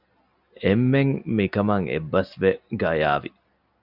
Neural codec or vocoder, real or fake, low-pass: none; real; 5.4 kHz